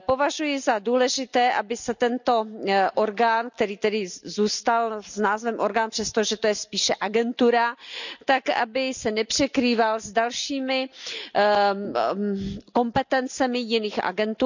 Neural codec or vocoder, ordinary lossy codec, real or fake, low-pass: none; none; real; 7.2 kHz